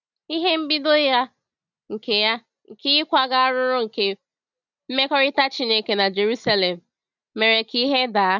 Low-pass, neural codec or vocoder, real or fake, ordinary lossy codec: 7.2 kHz; none; real; none